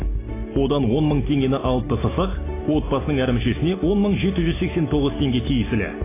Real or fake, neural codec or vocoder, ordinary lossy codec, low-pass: real; none; AAC, 16 kbps; 3.6 kHz